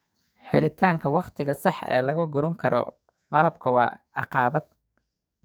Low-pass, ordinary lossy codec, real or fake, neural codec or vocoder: none; none; fake; codec, 44.1 kHz, 2.6 kbps, SNAC